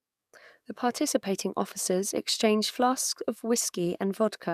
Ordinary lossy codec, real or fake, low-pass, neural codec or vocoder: none; fake; 14.4 kHz; codec, 44.1 kHz, 7.8 kbps, DAC